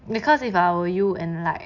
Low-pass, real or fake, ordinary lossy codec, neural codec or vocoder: 7.2 kHz; real; none; none